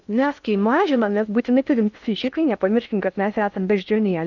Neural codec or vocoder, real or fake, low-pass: codec, 16 kHz in and 24 kHz out, 0.6 kbps, FocalCodec, streaming, 2048 codes; fake; 7.2 kHz